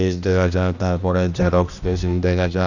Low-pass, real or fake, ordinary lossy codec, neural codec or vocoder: 7.2 kHz; fake; none; codec, 16 kHz, 1 kbps, X-Codec, HuBERT features, trained on general audio